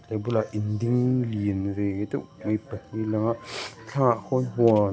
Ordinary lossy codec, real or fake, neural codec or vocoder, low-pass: none; real; none; none